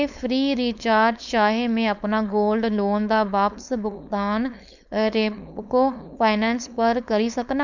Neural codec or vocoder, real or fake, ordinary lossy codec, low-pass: codec, 16 kHz, 4.8 kbps, FACodec; fake; none; 7.2 kHz